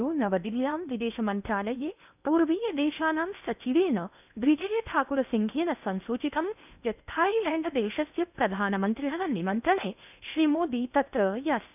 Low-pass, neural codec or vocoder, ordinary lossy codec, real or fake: 3.6 kHz; codec, 16 kHz in and 24 kHz out, 0.8 kbps, FocalCodec, streaming, 65536 codes; none; fake